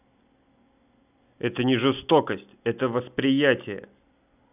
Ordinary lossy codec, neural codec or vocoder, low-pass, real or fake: none; none; 3.6 kHz; real